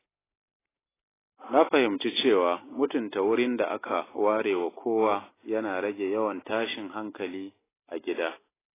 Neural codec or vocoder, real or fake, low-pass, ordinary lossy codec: none; real; 3.6 kHz; AAC, 16 kbps